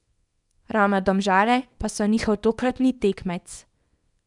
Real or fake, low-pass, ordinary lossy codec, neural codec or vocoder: fake; 10.8 kHz; none; codec, 24 kHz, 0.9 kbps, WavTokenizer, small release